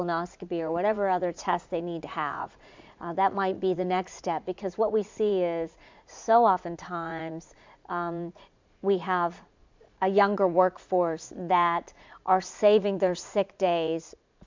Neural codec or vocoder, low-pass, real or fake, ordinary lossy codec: vocoder, 44.1 kHz, 80 mel bands, Vocos; 7.2 kHz; fake; MP3, 64 kbps